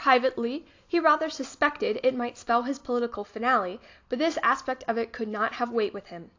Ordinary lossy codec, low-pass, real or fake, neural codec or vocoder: AAC, 48 kbps; 7.2 kHz; real; none